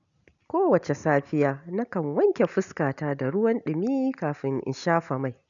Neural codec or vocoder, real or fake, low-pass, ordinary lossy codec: none; real; 7.2 kHz; none